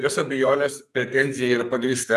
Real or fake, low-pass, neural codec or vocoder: fake; 14.4 kHz; codec, 44.1 kHz, 2.6 kbps, SNAC